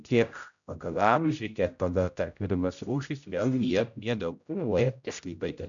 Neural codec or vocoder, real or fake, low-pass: codec, 16 kHz, 0.5 kbps, X-Codec, HuBERT features, trained on general audio; fake; 7.2 kHz